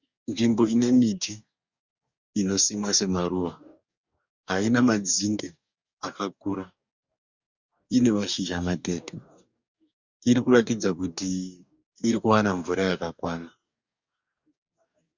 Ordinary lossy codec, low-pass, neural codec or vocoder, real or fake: Opus, 64 kbps; 7.2 kHz; codec, 44.1 kHz, 2.6 kbps, DAC; fake